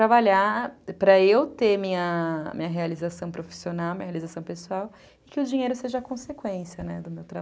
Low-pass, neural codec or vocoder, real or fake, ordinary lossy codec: none; none; real; none